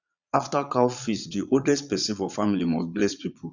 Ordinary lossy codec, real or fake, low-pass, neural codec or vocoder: none; fake; 7.2 kHz; vocoder, 22.05 kHz, 80 mel bands, Vocos